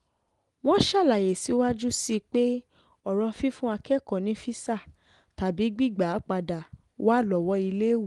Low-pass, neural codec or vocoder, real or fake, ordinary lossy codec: 10.8 kHz; none; real; Opus, 24 kbps